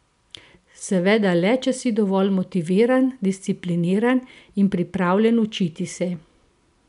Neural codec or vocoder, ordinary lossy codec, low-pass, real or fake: none; MP3, 96 kbps; 10.8 kHz; real